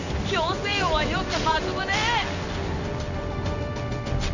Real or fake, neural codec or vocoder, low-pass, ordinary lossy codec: fake; codec, 16 kHz in and 24 kHz out, 1 kbps, XY-Tokenizer; 7.2 kHz; none